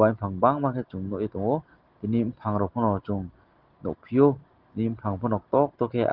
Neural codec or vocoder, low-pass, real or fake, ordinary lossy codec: none; 5.4 kHz; real; Opus, 16 kbps